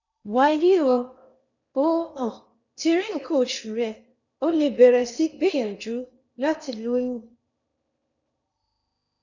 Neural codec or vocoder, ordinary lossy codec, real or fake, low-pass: codec, 16 kHz in and 24 kHz out, 0.8 kbps, FocalCodec, streaming, 65536 codes; none; fake; 7.2 kHz